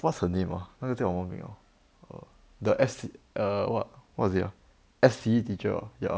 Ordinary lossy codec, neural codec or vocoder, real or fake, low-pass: none; none; real; none